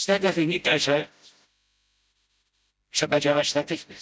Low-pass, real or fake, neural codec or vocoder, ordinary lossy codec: none; fake; codec, 16 kHz, 0.5 kbps, FreqCodec, smaller model; none